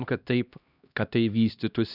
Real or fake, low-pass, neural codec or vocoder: fake; 5.4 kHz; codec, 16 kHz, 1 kbps, X-Codec, HuBERT features, trained on LibriSpeech